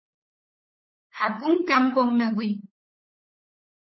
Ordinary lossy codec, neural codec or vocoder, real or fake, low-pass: MP3, 24 kbps; codec, 16 kHz, 8 kbps, FunCodec, trained on LibriTTS, 25 frames a second; fake; 7.2 kHz